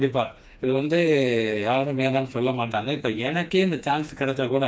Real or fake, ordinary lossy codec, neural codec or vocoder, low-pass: fake; none; codec, 16 kHz, 2 kbps, FreqCodec, smaller model; none